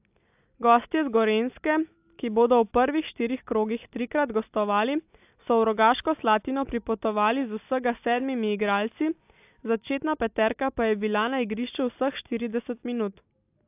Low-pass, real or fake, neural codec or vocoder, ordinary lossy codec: 3.6 kHz; real; none; AAC, 32 kbps